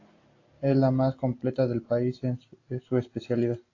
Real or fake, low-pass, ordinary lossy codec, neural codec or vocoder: real; 7.2 kHz; AAC, 32 kbps; none